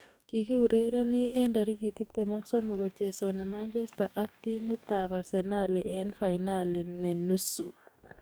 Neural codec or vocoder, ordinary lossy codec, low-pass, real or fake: codec, 44.1 kHz, 2.6 kbps, DAC; none; none; fake